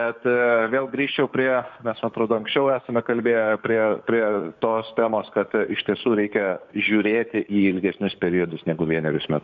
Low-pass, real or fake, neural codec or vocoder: 7.2 kHz; fake; codec, 16 kHz, 6 kbps, DAC